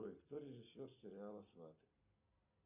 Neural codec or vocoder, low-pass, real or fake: none; 3.6 kHz; real